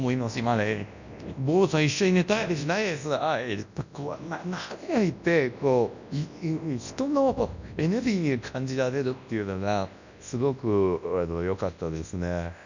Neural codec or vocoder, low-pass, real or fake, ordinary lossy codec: codec, 24 kHz, 0.9 kbps, WavTokenizer, large speech release; 7.2 kHz; fake; none